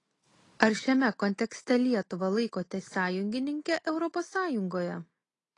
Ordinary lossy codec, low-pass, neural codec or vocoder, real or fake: AAC, 32 kbps; 10.8 kHz; none; real